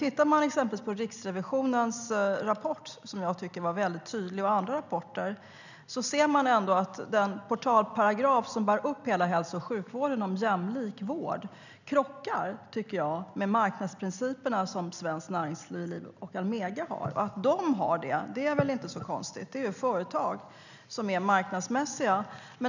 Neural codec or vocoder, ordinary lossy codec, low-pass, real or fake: none; none; 7.2 kHz; real